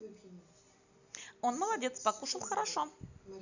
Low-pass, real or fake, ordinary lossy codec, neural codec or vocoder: 7.2 kHz; real; none; none